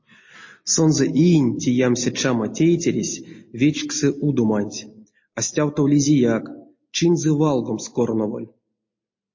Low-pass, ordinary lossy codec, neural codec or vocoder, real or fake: 7.2 kHz; MP3, 32 kbps; none; real